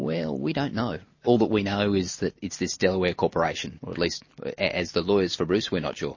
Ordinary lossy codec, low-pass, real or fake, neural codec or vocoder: MP3, 32 kbps; 7.2 kHz; real; none